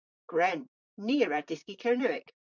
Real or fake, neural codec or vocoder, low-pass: fake; vocoder, 44.1 kHz, 128 mel bands, Pupu-Vocoder; 7.2 kHz